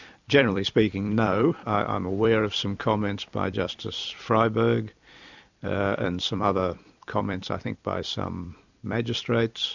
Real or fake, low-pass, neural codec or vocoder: fake; 7.2 kHz; vocoder, 44.1 kHz, 128 mel bands every 256 samples, BigVGAN v2